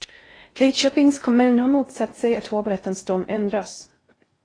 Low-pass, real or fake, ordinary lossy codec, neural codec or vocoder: 9.9 kHz; fake; AAC, 32 kbps; codec, 16 kHz in and 24 kHz out, 0.6 kbps, FocalCodec, streaming, 4096 codes